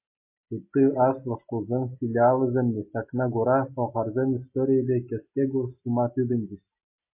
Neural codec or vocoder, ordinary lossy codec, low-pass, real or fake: none; MP3, 24 kbps; 3.6 kHz; real